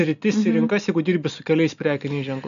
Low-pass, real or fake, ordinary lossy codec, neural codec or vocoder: 7.2 kHz; real; MP3, 96 kbps; none